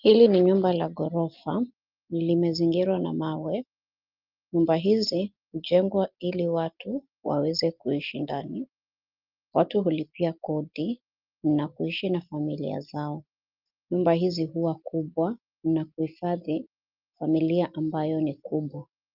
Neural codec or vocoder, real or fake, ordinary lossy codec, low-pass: none; real; Opus, 24 kbps; 5.4 kHz